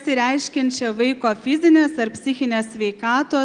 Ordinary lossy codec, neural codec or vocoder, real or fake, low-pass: Opus, 24 kbps; none; real; 9.9 kHz